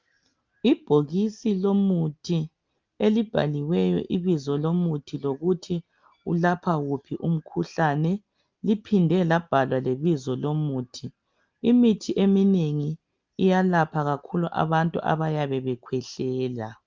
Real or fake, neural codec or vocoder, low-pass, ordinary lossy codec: real; none; 7.2 kHz; Opus, 24 kbps